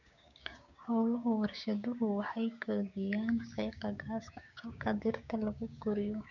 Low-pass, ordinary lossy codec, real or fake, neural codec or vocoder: 7.2 kHz; none; fake; codec, 16 kHz, 6 kbps, DAC